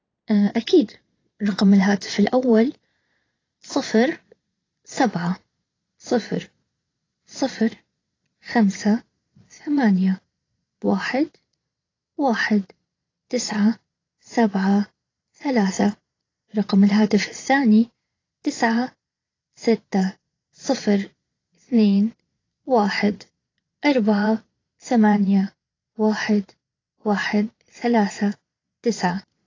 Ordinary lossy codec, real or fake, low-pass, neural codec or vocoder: AAC, 32 kbps; fake; 7.2 kHz; vocoder, 22.05 kHz, 80 mel bands, Vocos